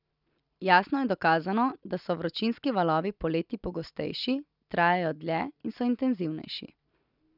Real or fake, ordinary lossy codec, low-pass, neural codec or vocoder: real; none; 5.4 kHz; none